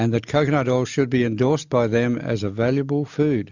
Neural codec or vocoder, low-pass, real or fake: none; 7.2 kHz; real